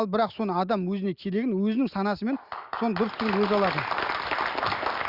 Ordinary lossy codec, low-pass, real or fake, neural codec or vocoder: Opus, 64 kbps; 5.4 kHz; real; none